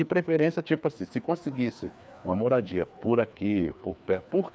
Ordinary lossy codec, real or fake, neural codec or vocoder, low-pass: none; fake; codec, 16 kHz, 2 kbps, FreqCodec, larger model; none